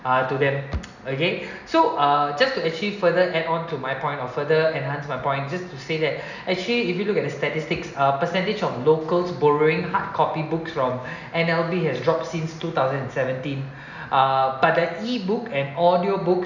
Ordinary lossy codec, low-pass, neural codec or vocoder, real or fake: none; 7.2 kHz; none; real